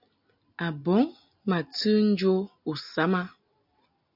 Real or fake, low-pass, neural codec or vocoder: real; 5.4 kHz; none